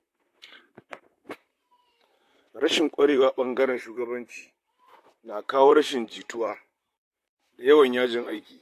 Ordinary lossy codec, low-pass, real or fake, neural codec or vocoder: AAC, 64 kbps; 14.4 kHz; fake; vocoder, 44.1 kHz, 128 mel bands, Pupu-Vocoder